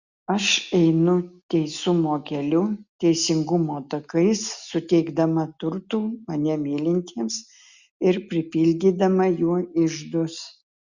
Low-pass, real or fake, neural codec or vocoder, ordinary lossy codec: 7.2 kHz; real; none; Opus, 64 kbps